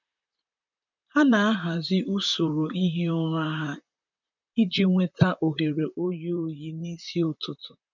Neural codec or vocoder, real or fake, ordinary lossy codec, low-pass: vocoder, 44.1 kHz, 128 mel bands, Pupu-Vocoder; fake; none; 7.2 kHz